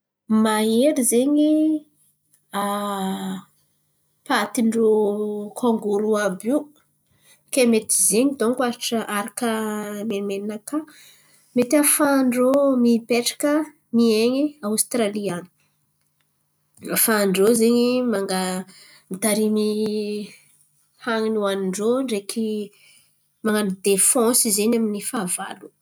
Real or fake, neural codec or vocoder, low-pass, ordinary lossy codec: real; none; none; none